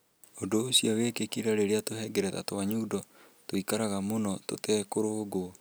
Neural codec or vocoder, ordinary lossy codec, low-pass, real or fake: none; none; none; real